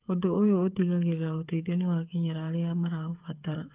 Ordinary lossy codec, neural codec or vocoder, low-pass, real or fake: Opus, 32 kbps; codec, 16 kHz, 8 kbps, FreqCodec, smaller model; 3.6 kHz; fake